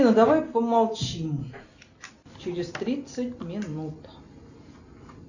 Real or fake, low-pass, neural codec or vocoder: real; 7.2 kHz; none